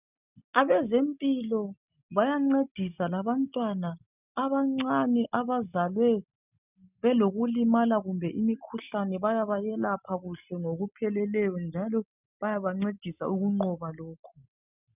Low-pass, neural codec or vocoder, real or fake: 3.6 kHz; none; real